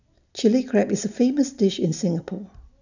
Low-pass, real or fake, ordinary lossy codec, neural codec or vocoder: 7.2 kHz; real; none; none